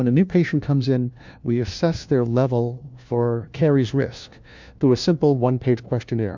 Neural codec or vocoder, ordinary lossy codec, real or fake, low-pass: codec, 16 kHz, 1 kbps, FunCodec, trained on LibriTTS, 50 frames a second; MP3, 64 kbps; fake; 7.2 kHz